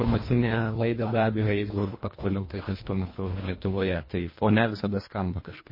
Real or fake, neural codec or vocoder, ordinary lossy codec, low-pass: fake; codec, 24 kHz, 1.5 kbps, HILCodec; MP3, 24 kbps; 5.4 kHz